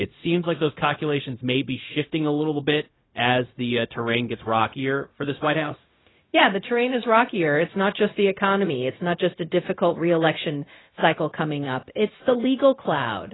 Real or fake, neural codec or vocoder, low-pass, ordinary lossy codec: fake; codec, 16 kHz, 0.4 kbps, LongCat-Audio-Codec; 7.2 kHz; AAC, 16 kbps